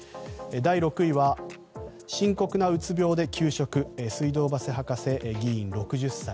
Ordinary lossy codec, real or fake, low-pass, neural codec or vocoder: none; real; none; none